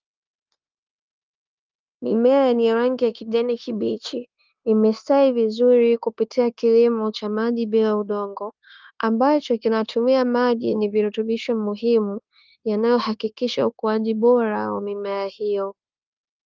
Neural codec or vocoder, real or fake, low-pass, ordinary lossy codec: codec, 16 kHz, 0.9 kbps, LongCat-Audio-Codec; fake; 7.2 kHz; Opus, 32 kbps